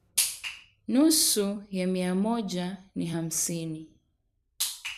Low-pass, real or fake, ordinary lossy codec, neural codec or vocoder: 14.4 kHz; fake; none; vocoder, 44.1 kHz, 128 mel bands every 256 samples, BigVGAN v2